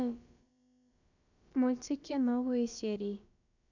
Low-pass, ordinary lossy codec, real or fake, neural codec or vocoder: 7.2 kHz; none; fake; codec, 16 kHz, about 1 kbps, DyCAST, with the encoder's durations